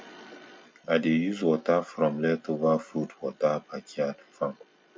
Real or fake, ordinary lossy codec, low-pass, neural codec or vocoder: real; none; none; none